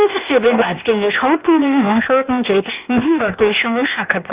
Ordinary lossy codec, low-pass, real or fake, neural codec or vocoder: Opus, 64 kbps; 3.6 kHz; fake; codec, 16 kHz, 0.8 kbps, ZipCodec